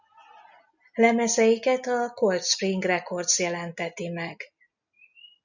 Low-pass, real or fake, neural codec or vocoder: 7.2 kHz; real; none